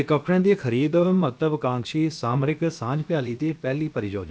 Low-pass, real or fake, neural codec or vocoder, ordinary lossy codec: none; fake; codec, 16 kHz, about 1 kbps, DyCAST, with the encoder's durations; none